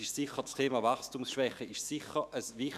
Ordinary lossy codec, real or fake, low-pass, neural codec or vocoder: none; fake; 14.4 kHz; autoencoder, 48 kHz, 128 numbers a frame, DAC-VAE, trained on Japanese speech